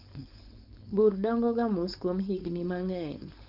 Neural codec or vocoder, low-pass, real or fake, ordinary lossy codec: codec, 16 kHz, 4.8 kbps, FACodec; 5.4 kHz; fake; none